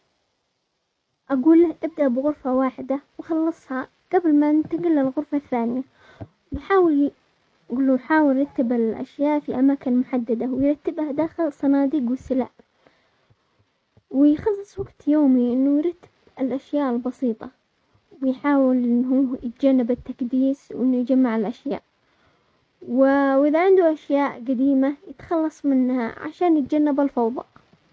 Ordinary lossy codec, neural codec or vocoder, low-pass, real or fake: none; none; none; real